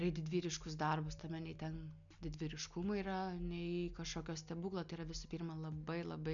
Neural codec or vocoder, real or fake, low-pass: none; real; 7.2 kHz